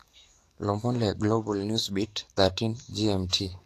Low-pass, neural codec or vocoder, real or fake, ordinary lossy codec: 14.4 kHz; codec, 44.1 kHz, 7.8 kbps, DAC; fake; AAC, 64 kbps